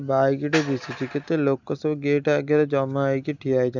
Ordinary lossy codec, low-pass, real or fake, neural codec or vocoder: none; 7.2 kHz; real; none